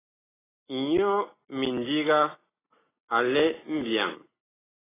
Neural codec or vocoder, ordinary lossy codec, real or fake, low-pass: none; AAC, 16 kbps; real; 3.6 kHz